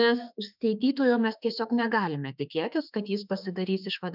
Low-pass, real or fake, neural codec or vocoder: 5.4 kHz; fake; autoencoder, 48 kHz, 32 numbers a frame, DAC-VAE, trained on Japanese speech